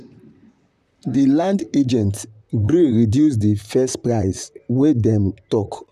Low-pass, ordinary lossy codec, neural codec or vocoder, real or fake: 14.4 kHz; none; vocoder, 44.1 kHz, 128 mel bands, Pupu-Vocoder; fake